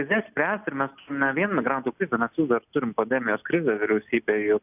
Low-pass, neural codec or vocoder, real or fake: 3.6 kHz; none; real